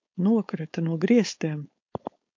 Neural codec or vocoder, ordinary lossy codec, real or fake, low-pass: codec, 16 kHz, 4.8 kbps, FACodec; MP3, 48 kbps; fake; 7.2 kHz